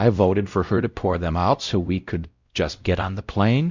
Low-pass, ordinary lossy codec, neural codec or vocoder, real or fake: 7.2 kHz; Opus, 64 kbps; codec, 16 kHz, 0.5 kbps, X-Codec, WavLM features, trained on Multilingual LibriSpeech; fake